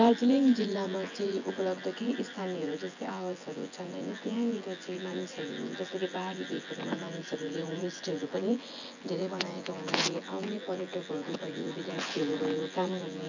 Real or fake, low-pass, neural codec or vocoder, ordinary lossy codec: fake; 7.2 kHz; vocoder, 24 kHz, 100 mel bands, Vocos; none